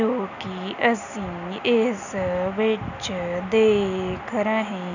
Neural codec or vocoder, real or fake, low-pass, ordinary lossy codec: none; real; 7.2 kHz; none